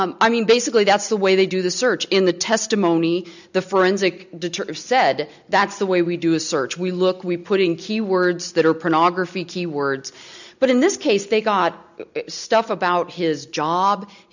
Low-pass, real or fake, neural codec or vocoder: 7.2 kHz; real; none